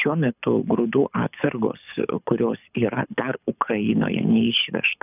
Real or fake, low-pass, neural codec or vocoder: fake; 3.6 kHz; codec, 24 kHz, 6 kbps, HILCodec